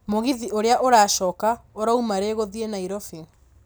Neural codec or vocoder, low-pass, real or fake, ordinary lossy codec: none; none; real; none